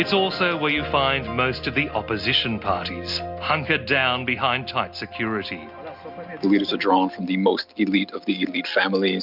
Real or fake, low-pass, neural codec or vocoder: real; 5.4 kHz; none